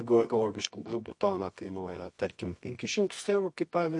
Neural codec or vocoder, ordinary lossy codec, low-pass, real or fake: codec, 24 kHz, 0.9 kbps, WavTokenizer, medium music audio release; MP3, 48 kbps; 10.8 kHz; fake